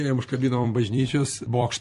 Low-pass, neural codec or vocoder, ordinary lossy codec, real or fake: 14.4 kHz; vocoder, 44.1 kHz, 128 mel bands every 256 samples, BigVGAN v2; MP3, 48 kbps; fake